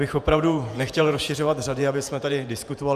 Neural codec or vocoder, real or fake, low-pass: vocoder, 48 kHz, 128 mel bands, Vocos; fake; 14.4 kHz